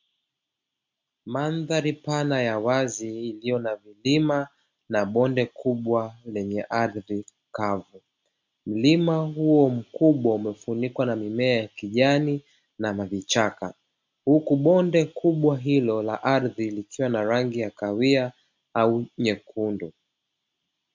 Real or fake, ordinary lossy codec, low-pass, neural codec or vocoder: real; MP3, 64 kbps; 7.2 kHz; none